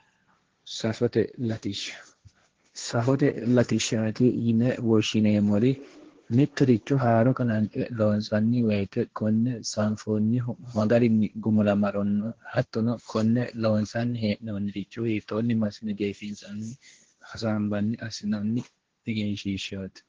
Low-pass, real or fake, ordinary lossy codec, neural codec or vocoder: 7.2 kHz; fake; Opus, 16 kbps; codec, 16 kHz, 1.1 kbps, Voila-Tokenizer